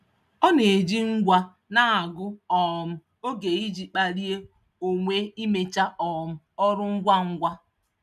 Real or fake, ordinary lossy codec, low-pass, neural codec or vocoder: real; none; 14.4 kHz; none